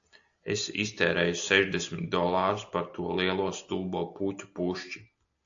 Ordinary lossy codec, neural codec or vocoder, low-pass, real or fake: AAC, 64 kbps; none; 7.2 kHz; real